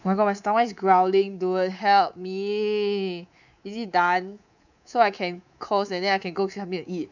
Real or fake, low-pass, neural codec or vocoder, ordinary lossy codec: fake; 7.2 kHz; codec, 24 kHz, 3.1 kbps, DualCodec; none